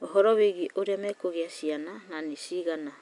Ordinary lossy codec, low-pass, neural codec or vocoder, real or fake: none; 10.8 kHz; none; real